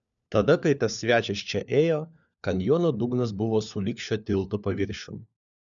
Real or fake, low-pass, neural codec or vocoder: fake; 7.2 kHz; codec, 16 kHz, 4 kbps, FunCodec, trained on LibriTTS, 50 frames a second